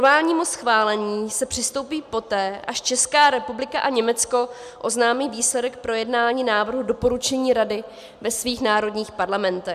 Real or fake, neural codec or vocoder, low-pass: real; none; 14.4 kHz